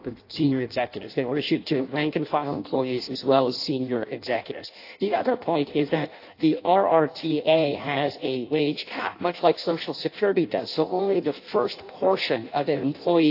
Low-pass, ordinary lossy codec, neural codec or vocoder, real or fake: 5.4 kHz; AAC, 32 kbps; codec, 16 kHz in and 24 kHz out, 0.6 kbps, FireRedTTS-2 codec; fake